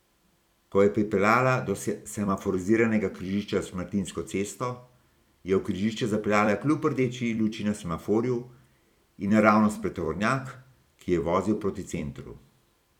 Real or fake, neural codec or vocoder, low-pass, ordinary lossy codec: fake; vocoder, 44.1 kHz, 128 mel bands every 256 samples, BigVGAN v2; 19.8 kHz; none